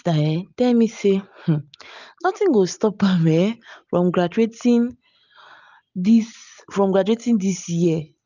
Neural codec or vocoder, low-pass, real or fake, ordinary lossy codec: none; 7.2 kHz; real; none